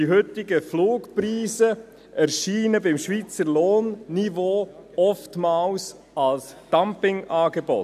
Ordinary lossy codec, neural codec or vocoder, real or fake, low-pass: none; none; real; 14.4 kHz